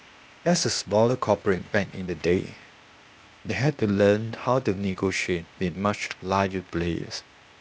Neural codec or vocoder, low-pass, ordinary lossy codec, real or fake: codec, 16 kHz, 0.8 kbps, ZipCodec; none; none; fake